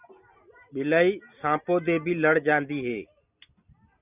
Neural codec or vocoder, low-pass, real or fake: none; 3.6 kHz; real